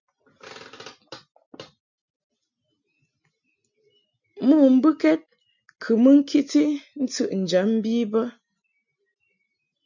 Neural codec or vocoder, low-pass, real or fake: none; 7.2 kHz; real